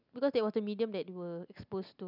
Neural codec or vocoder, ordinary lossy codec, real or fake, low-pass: none; none; real; 5.4 kHz